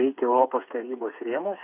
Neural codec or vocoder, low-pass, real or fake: codec, 16 kHz, 4 kbps, FreqCodec, smaller model; 3.6 kHz; fake